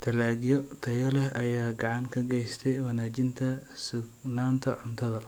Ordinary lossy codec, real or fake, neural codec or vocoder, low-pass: none; fake; codec, 44.1 kHz, 7.8 kbps, DAC; none